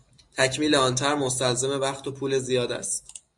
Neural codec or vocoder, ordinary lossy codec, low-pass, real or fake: none; MP3, 64 kbps; 10.8 kHz; real